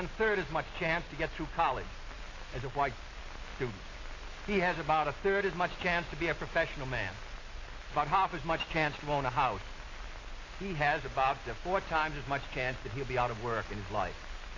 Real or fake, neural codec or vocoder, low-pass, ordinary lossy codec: real; none; 7.2 kHz; AAC, 32 kbps